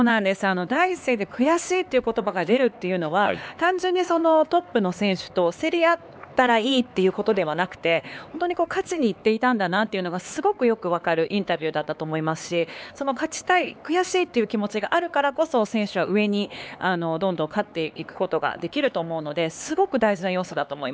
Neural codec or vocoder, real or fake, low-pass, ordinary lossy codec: codec, 16 kHz, 4 kbps, X-Codec, HuBERT features, trained on LibriSpeech; fake; none; none